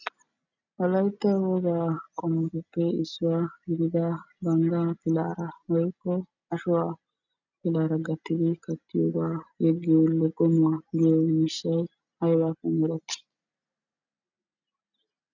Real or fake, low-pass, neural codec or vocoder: real; 7.2 kHz; none